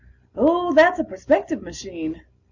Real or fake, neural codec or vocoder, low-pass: real; none; 7.2 kHz